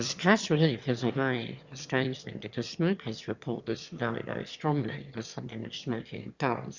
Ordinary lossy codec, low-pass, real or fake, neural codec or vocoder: Opus, 64 kbps; 7.2 kHz; fake; autoencoder, 22.05 kHz, a latent of 192 numbers a frame, VITS, trained on one speaker